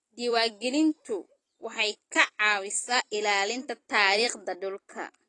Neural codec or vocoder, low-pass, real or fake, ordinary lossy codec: vocoder, 44.1 kHz, 128 mel bands every 256 samples, BigVGAN v2; 10.8 kHz; fake; AAC, 32 kbps